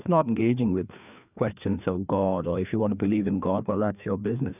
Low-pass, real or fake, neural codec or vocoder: 3.6 kHz; fake; codec, 16 kHz, 2 kbps, FreqCodec, larger model